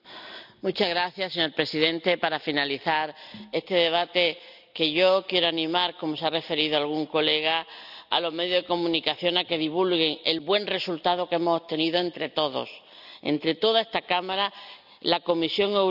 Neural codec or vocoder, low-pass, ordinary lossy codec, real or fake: none; 5.4 kHz; none; real